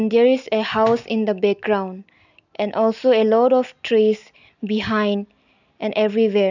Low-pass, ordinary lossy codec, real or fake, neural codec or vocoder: 7.2 kHz; none; real; none